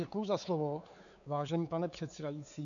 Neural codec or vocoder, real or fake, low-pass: codec, 16 kHz, 4 kbps, X-Codec, WavLM features, trained on Multilingual LibriSpeech; fake; 7.2 kHz